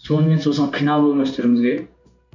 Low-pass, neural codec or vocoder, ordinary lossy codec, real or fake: 7.2 kHz; codec, 16 kHz in and 24 kHz out, 1 kbps, XY-Tokenizer; none; fake